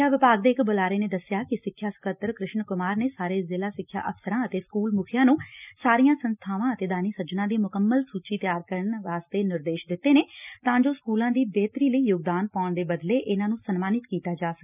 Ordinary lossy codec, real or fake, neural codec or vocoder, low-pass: none; real; none; 3.6 kHz